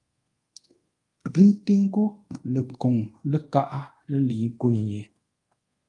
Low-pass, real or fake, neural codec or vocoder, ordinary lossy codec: 10.8 kHz; fake; codec, 24 kHz, 0.5 kbps, DualCodec; Opus, 32 kbps